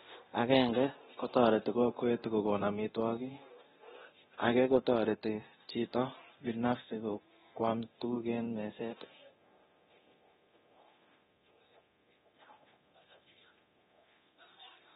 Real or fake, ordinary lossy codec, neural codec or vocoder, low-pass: fake; AAC, 16 kbps; codec, 24 kHz, 0.9 kbps, DualCodec; 10.8 kHz